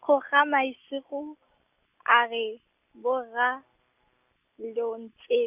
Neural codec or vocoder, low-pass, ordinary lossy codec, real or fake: none; 3.6 kHz; none; real